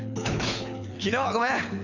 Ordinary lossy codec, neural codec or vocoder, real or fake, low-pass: none; codec, 24 kHz, 6 kbps, HILCodec; fake; 7.2 kHz